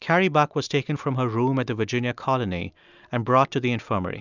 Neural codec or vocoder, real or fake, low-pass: none; real; 7.2 kHz